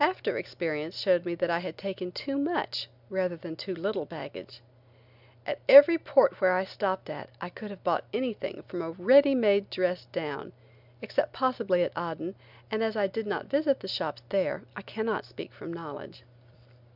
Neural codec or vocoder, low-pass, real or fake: none; 5.4 kHz; real